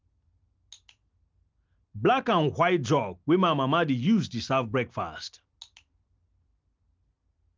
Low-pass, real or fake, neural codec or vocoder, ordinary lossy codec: 7.2 kHz; real; none; Opus, 32 kbps